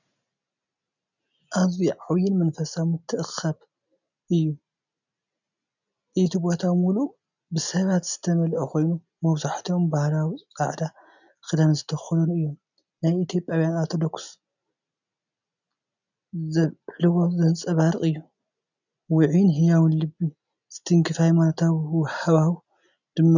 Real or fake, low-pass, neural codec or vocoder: real; 7.2 kHz; none